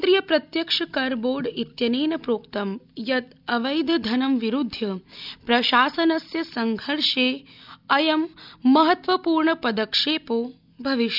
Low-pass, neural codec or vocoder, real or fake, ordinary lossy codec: 5.4 kHz; none; real; none